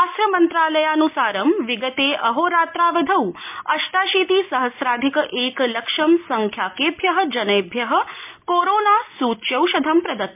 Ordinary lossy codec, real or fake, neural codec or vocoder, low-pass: MP3, 32 kbps; real; none; 3.6 kHz